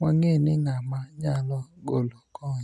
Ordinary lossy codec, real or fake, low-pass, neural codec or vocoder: none; real; none; none